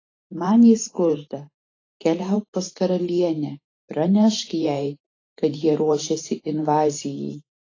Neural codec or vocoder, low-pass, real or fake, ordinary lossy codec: vocoder, 44.1 kHz, 128 mel bands every 512 samples, BigVGAN v2; 7.2 kHz; fake; AAC, 32 kbps